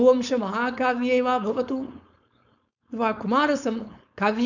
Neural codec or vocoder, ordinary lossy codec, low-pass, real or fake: codec, 16 kHz, 4.8 kbps, FACodec; none; 7.2 kHz; fake